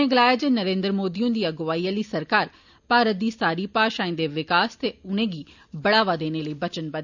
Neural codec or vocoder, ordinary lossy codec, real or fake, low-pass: none; none; real; 7.2 kHz